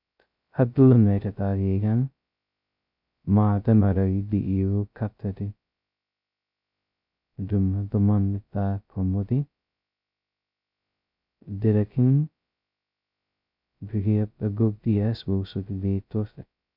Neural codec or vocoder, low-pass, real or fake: codec, 16 kHz, 0.2 kbps, FocalCodec; 5.4 kHz; fake